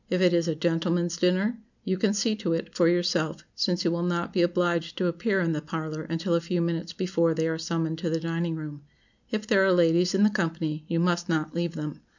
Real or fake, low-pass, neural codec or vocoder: real; 7.2 kHz; none